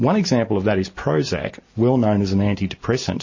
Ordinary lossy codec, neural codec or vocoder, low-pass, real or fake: MP3, 32 kbps; none; 7.2 kHz; real